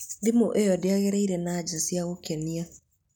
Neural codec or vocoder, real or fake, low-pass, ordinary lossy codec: none; real; none; none